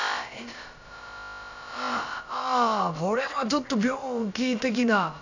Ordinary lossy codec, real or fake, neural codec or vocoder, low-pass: none; fake; codec, 16 kHz, about 1 kbps, DyCAST, with the encoder's durations; 7.2 kHz